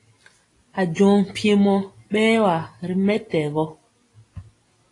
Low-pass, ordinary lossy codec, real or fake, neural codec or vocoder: 10.8 kHz; AAC, 32 kbps; real; none